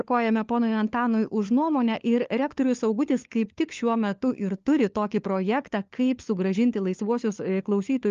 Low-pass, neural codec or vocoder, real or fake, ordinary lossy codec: 7.2 kHz; codec, 16 kHz, 4 kbps, FunCodec, trained on LibriTTS, 50 frames a second; fake; Opus, 32 kbps